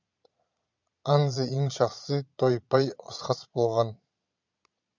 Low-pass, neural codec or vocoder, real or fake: 7.2 kHz; vocoder, 22.05 kHz, 80 mel bands, Vocos; fake